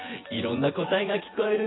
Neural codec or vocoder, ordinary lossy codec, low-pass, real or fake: vocoder, 24 kHz, 100 mel bands, Vocos; AAC, 16 kbps; 7.2 kHz; fake